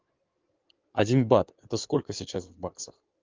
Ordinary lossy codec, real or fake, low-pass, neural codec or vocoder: Opus, 32 kbps; fake; 7.2 kHz; codec, 16 kHz in and 24 kHz out, 2.2 kbps, FireRedTTS-2 codec